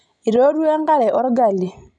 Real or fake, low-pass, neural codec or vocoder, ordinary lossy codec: real; 10.8 kHz; none; none